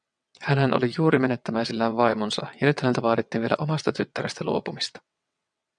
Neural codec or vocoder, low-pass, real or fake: vocoder, 22.05 kHz, 80 mel bands, WaveNeXt; 9.9 kHz; fake